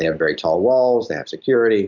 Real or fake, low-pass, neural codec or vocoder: real; 7.2 kHz; none